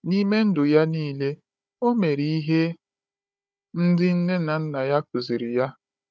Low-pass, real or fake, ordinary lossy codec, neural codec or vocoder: none; fake; none; codec, 16 kHz, 16 kbps, FunCodec, trained on Chinese and English, 50 frames a second